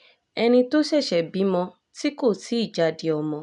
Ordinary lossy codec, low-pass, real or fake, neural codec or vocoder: none; 10.8 kHz; real; none